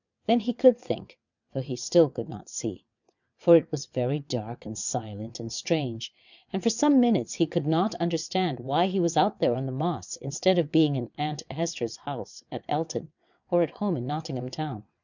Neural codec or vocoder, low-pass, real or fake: vocoder, 22.05 kHz, 80 mel bands, WaveNeXt; 7.2 kHz; fake